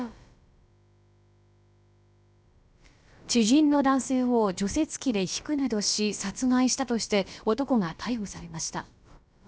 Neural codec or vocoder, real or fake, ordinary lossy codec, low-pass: codec, 16 kHz, about 1 kbps, DyCAST, with the encoder's durations; fake; none; none